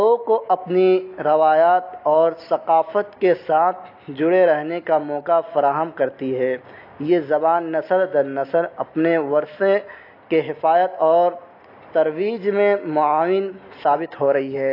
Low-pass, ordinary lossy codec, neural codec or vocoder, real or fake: 5.4 kHz; AAC, 32 kbps; none; real